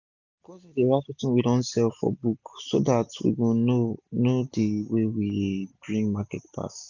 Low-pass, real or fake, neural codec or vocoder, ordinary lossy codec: 7.2 kHz; real; none; none